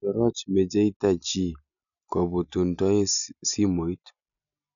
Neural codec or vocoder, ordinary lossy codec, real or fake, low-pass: none; none; real; 7.2 kHz